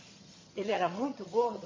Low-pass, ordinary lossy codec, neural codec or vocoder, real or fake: 7.2 kHz; MP3, 32 kbps; vocoder, 22.05 kHz, 80 mel bands, HiFi-GAN; fake